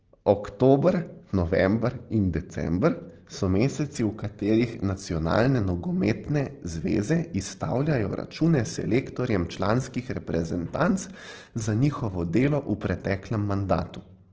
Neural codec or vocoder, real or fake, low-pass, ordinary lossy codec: none; real; 7.2 kHz; Opus, 16 kbps